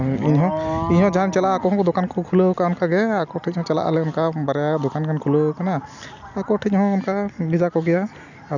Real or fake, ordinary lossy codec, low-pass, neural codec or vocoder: real; none; 7.2 kHz; none